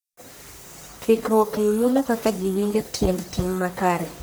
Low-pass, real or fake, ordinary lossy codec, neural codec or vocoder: none; fake; none; codec, 44.1 kHz, 1.7 kbps, Pupu-Codec